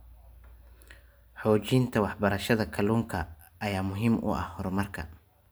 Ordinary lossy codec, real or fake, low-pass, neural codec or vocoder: none; real; none; none